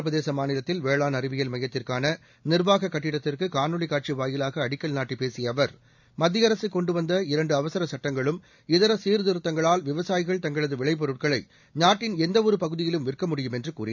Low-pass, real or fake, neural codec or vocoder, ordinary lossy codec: 7.2 kHz; real; none; none